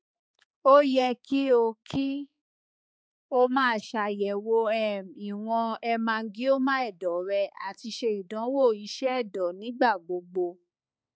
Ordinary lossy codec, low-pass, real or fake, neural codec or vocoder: none; none; fake; codec, 16 kHz, 4 kbps, X-Codec, HuBERT features, trained on balanced general audio